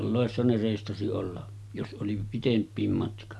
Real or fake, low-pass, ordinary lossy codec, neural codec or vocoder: real; none; none; none